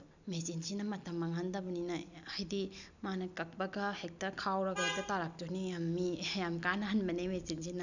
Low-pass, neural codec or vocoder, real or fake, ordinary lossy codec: 7.2 kHz; none; real; none